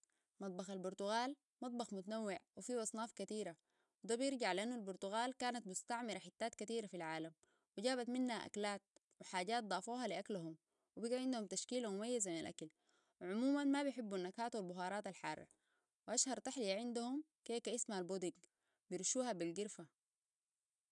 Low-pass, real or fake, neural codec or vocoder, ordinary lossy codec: 10.8 kHz; real; none; none